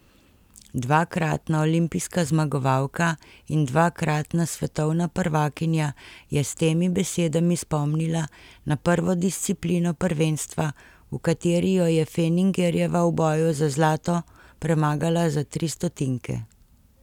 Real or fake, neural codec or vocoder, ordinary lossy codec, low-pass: real; none; none; 19.8 kHz